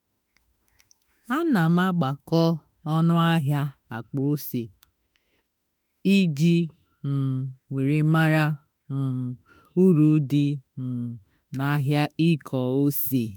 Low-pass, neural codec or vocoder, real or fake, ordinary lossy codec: none; autoencoder, 48 kHz, 32 numbers a frame, DAC-VAE, trained on Japanese speech; fake; none